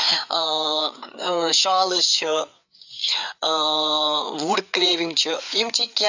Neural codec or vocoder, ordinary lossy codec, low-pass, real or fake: codec, 16 kHz, 4 kbps, FreqCodec, larger model; none; 7.2 kHz; fake